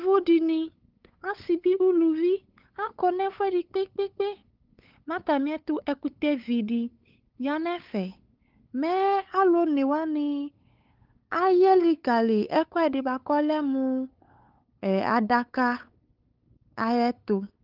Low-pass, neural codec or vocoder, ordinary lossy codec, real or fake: 5.4 kHz; codec, 16 kHz, 8 kbps, FunCodec, trained on LibriTTS, 25 frames a second; Opus, 24 kbps; fake